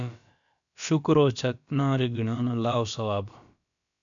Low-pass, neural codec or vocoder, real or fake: 7.2 kHz; codec, 16 kHz, about 1 kbps, DyCAST, with the encoder's durations; fake